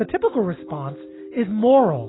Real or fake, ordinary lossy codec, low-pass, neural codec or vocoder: real; AAC, 16 kbps; 7.2 kHz; none